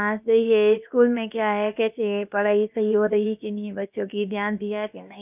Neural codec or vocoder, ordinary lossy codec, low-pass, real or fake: codec, 16 kHz, about 1 kbps, DyCAST, with the encoder's durations; none; 3.6 kHz; fake